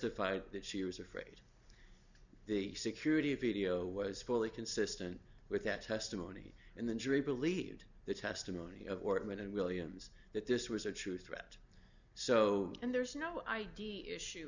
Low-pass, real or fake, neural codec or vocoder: 7.2 kHz; real; none